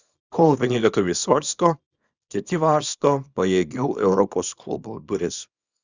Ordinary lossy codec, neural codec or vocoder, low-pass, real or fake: Opus, 64 kbps; codec, 24 kHz, 0.9 kbps, WavTokenizer, small release; 7.2 kHz; fake